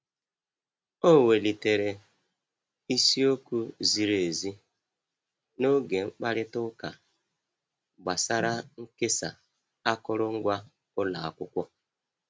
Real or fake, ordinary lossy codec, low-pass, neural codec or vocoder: real; none; none; none